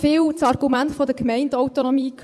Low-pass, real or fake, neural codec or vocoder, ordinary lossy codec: none; fake; vocoder, 24 kHz, 100 mel bands, Vocos; none